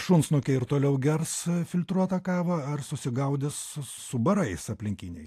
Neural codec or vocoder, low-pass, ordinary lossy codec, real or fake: none; 14.4 kHz; AAC, 64 kbps; real